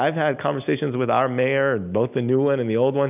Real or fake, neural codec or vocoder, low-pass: real; none; 3.6 kHz